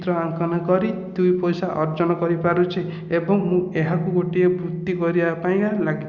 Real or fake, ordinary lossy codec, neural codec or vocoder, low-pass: real; Opus, 64 kbps; none; 7.2 kHz